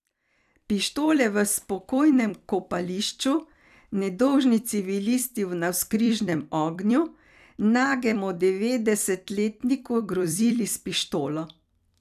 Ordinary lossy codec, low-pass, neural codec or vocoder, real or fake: none; 14.4 kHz; vocoder, 44.1 kHz, 128 mel bands every 256 samples, BigVGAN v2; fake